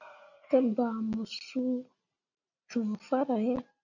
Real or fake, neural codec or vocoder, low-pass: real; none; 7.2 kHz